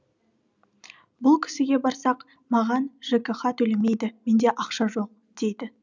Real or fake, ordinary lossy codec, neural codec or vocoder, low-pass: real; none; none; 7.2 kHz